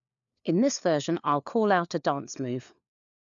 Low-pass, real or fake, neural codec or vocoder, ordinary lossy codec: 7.2 kHz; fake; codec, 16 kHz, 4 kbps, FunCodec, trained on LibriTTS, 50 frames a second; none